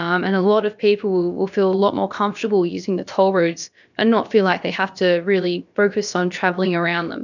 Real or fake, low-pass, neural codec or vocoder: fake; 7.2 kHz; codec, 16 kHz, about 1 kbps, DyCAST, with the encoder's durations